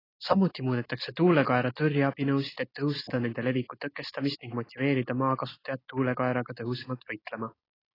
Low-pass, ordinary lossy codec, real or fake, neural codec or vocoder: 5.4 kHz; AAC, 24 kbps; real; none